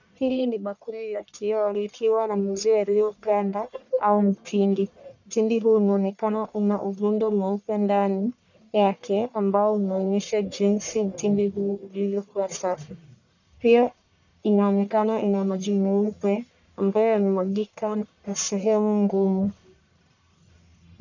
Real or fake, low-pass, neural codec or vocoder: fake; 7.2 kHz; codec, 44.1 kHz, 1.7 kbps, Pupu-Codec